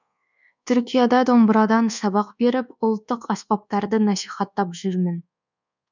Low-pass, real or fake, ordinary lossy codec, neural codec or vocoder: 7.2 kHz; fake; none; codec, 24 kHz, 1.2 kbps, DualCodec